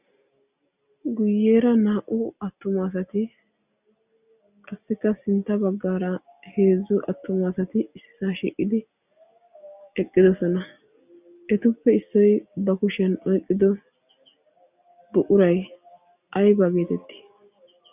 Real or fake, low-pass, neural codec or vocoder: real; 3.6 kHz; none